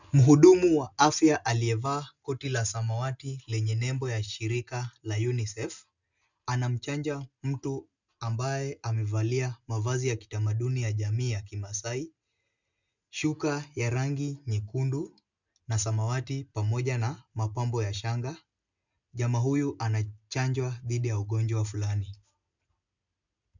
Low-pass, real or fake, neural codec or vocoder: 7.2 kHz; real; none